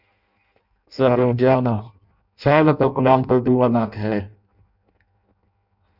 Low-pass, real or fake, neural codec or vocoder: 5.4 kHz; fake; codec, 16 kHz in and 24 kHz out, 0.6 kbps, FireRedTTS-2 codec